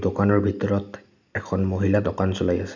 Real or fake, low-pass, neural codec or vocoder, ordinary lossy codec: real; 7.2 kHz; none; none